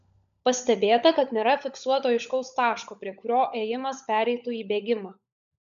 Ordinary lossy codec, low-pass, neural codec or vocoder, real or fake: MP3, 96 kbps; 7.2 kHz; codec, 16 kHz, 16 kbps, FunCodec, trained on LibriTTS, 50 frames a second; fake